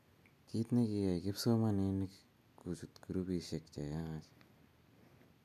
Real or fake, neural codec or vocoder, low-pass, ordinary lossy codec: real; none; 14.4 kHz; none